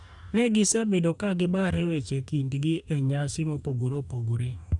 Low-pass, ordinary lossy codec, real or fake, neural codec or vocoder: 10.8 kHz; none; fake; codec, 44.1 kHz, 2.6 kbps, DAC